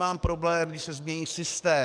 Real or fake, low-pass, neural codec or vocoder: fake; 9.9 kHz; codec, 44.1 kHz, 7.8 kbps, DAC